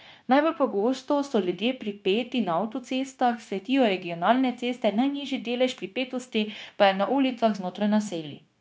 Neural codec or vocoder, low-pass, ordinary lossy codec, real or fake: codec, 16 kHz, 0.9 kbps, LongCat-Audio-Codec; none; none; fake